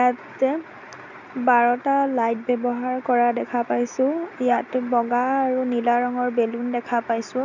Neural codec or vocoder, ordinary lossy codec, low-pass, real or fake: none; none; 7.2 kHz; real